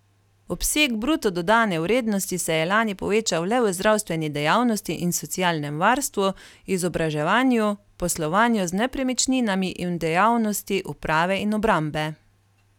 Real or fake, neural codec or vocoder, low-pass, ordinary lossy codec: real; none; 19.8 kHz; none